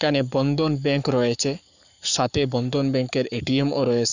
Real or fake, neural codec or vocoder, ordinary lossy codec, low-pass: fake; codec, 44.1 kHz, 7.8 kbps, Pupu-Codec; none; 7.2 kHz